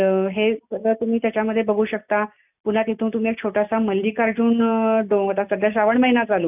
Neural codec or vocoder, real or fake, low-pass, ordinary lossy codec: none; real; 3.6 kHz; none